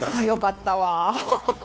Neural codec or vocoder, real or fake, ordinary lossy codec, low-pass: codec, 16 kHz, 2 kbps, X-Codec, WavLM features, trained on Multilingual LibriSpeech; fake; none; none